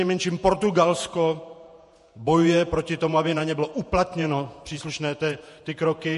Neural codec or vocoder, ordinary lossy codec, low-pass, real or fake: vocoder, 48 kHz, 128 mel bands, Vocos; MP3, 48 kbps; 14.4 kHz; fake